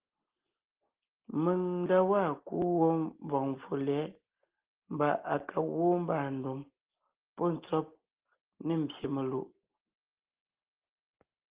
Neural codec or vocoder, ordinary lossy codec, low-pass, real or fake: none; Opus, 16 kbps; 3.6 kHz; real